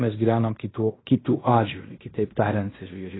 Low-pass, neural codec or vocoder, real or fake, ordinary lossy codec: 7.2 kHz; codec, 16 kHz in and 24 kHz out, 0.9 kbps, LongCat-Audio-Codec, fine tuned four codebook decoder; fake; AAC, 16 kbps